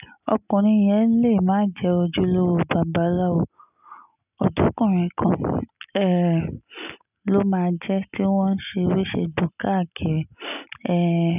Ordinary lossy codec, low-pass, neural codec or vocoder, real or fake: none; 3.6 kHz; none; real